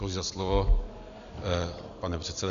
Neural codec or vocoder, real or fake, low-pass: none; real; 7.2 kHz